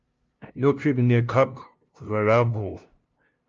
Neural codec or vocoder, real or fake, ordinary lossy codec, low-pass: codec, 16 kHz, 0.5 kbps, FunCodec, trained on LibriTTS, 25 frames a second; fake; Opus, 24 kbps; 7.2 kHz